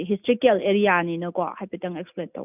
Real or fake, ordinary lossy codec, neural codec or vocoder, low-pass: real; none; none; 3.6 kHz